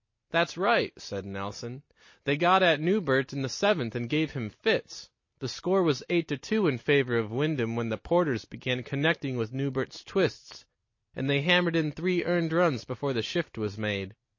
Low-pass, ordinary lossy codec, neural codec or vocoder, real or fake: 7.2 kHz; MP3, 32 kbps; none; real